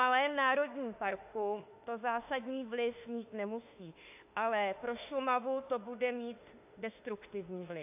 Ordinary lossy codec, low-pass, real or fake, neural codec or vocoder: AAC, 32 kbps; 3.6 kHz; fake; autoencoder, 48 kHz, 32 numbers a frame, DAC-VAE, trained on Japanese speech